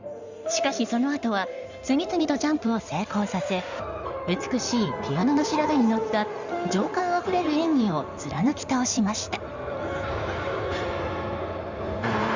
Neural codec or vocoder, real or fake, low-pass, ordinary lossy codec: codec, 16 kHz in and 24 kHz out, 2.2 kbps, FireRedTTS-2 codec; fake; 7.2 kHz; Opus, 64 kbps